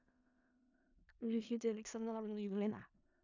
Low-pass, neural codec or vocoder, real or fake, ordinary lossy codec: 7.2 kHz; codec, 16 kHz in and 24 kHz out, 0.4 kbps, LongCat-Audio-Codec, four codebook decoder; fake; MP3, 64 kbps